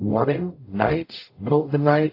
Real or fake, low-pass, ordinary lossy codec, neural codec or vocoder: fake; 5.4 kHz; AAC, 32 kbps; codec, 44.1 kHz, 0.9 kbps, DAC